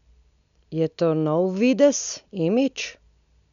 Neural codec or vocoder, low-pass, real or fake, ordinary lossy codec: none; 7.2 kHz; real; none